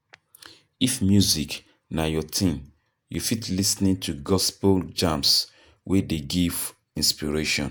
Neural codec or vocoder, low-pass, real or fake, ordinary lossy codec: vocoder, 48 kHz, 128 mel bands, Vocos; none; fake; none